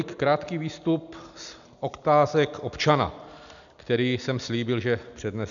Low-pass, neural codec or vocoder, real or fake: 7.2 kHz; none; real